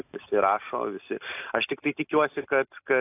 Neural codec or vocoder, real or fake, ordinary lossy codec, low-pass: none; real; AAC, 32 kbps; 3.6 kHz